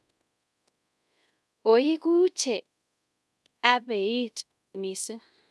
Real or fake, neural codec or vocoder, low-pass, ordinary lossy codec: fake; codec, 24 kHz, 0.5 kbps, DualCodec; none; none